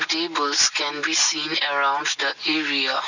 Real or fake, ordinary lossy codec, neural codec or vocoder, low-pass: real; none; none; 7.2 kHz